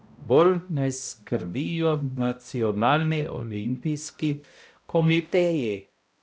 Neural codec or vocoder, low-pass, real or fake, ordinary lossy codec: codec, 16 kHz, 0.5 kbps, X-Codec, HuBERT features, trained on balanced general audio; none; fake; none